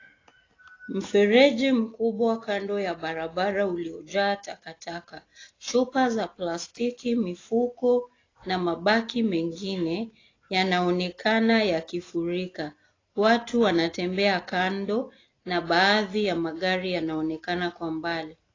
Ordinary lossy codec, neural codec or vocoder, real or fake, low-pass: AAC, 32 kbps; none; real; 7.2 kHz